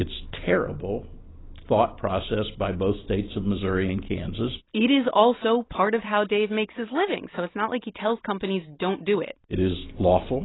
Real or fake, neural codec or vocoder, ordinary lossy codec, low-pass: real; none; AAC, 16 kbps; 7.2 kHz